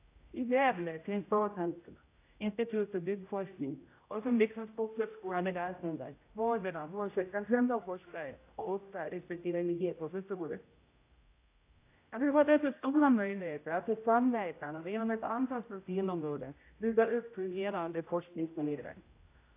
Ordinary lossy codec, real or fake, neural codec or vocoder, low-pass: AAC, 24 kbps; fake; codec, 16 kHz, 0.5 kbps, X-Codec, HuBERT features, trained on general audio; 3.6 kHz